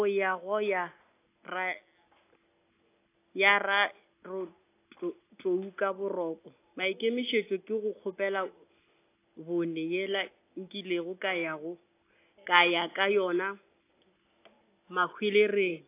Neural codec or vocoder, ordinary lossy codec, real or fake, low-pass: none; AAC, 24 kbps; real; 3.6 kHz